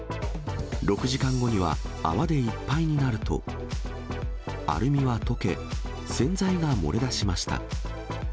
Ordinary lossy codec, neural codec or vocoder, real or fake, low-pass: none; none; real; none